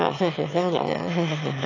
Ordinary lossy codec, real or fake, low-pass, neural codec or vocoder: AAC, 32 kbps; fake; 7.2 kHz; autoencoder, 22.05 kHz, a latent of 192 numbers a frame, VITS, trained on one speaker